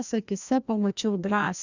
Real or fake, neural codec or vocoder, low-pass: fake; codec, 16 kHz, 1 kbps, FreqCodec, larger model; 7.2 kHz